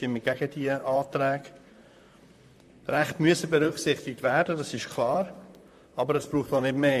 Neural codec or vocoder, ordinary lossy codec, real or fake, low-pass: vocoder, 44.1 kHz, 128 mel bands, Pupu-Vocoder; MP3, 64 kbps; fake; 14.4 kHz